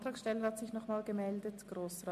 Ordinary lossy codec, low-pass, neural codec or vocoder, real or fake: MP3, 64 kbps; 14.4 kHz; none; real